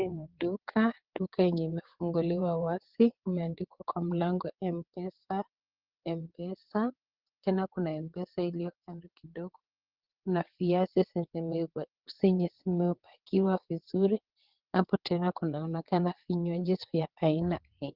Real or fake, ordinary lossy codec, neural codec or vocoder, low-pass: fake; Opus, 16 kbps; vocoder, 22.05 kHz, 80 mel bands, WaveNeXt; 5.4 kHz